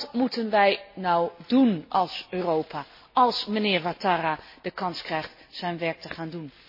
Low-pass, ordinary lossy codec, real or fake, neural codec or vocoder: 5.4 kHz; MP3, 24 kbps; real; none